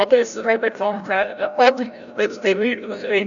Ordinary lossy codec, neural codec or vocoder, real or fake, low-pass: none; codec, 16 kHz, 0.5 kbps, FreqCodec, larger model; fake; 7.2 kHz